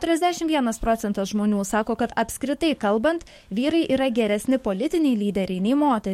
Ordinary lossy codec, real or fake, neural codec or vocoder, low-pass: MP3, 64 kbps; fake; codec, 44.1 kHz, 7.8 kbps, DAC; 19.8 kHz